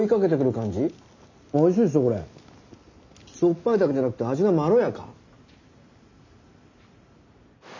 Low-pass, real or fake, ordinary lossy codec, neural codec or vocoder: 7.2 kHz; real; none; none